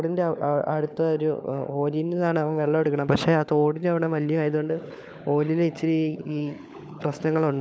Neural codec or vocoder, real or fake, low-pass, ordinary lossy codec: codec, 16 kHz, 16 kbps, FunCodec, trained on LibriTTS, 50 frames a second; fake; none; none